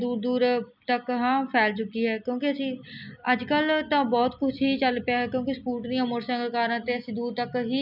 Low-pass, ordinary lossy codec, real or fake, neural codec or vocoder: 5.4 kHz; none; real; none